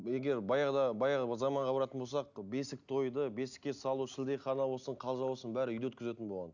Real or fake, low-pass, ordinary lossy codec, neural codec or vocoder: real; 7.2 kHz; none; none